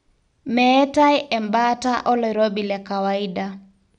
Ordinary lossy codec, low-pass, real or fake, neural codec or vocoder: none; 9.9 kHz; real; none